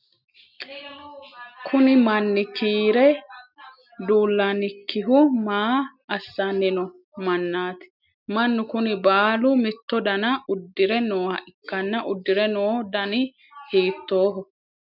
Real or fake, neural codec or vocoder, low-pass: real; none; 5.4 kHz